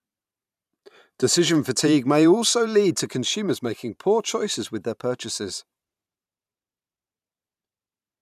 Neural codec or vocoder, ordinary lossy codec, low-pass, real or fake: vocoder, 44.1 kHz, 128 mel bands every 512 samples, BigVGAN v2; none; 14.4 kHz; fake